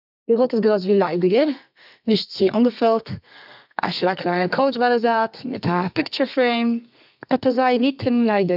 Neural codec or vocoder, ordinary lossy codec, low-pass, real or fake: codec, 32 kHz, 1.9 kbps, SNAC; none; 5.4 kHz; fake